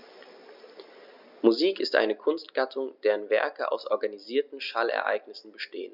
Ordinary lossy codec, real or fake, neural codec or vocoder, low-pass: none; real; none; 5.4 kHz